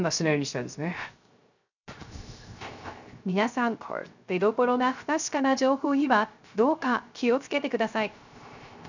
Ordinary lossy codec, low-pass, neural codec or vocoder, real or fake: none; 7.2 kHz; codec, 16 kHz, 0.3 kbps, FocalCodec; fake